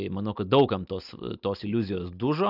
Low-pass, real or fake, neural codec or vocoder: 5.4 kHz; real; none